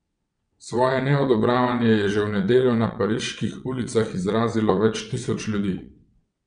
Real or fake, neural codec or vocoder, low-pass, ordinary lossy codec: fake; vocoder, 22.05 kHz, 80 mel bands, WaveNeXt; 9.9 kHz; none